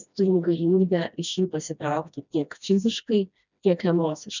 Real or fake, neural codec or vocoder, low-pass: fake; codec, 16 kHz, 1 kbps, FreqCodec, smaller model; 7.2 kHz